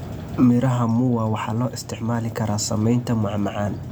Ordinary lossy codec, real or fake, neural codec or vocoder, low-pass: none; real; none; none